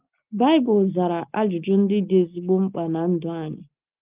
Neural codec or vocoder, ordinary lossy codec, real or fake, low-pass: none; Opus, 32 kbps; real; 3.6 kHz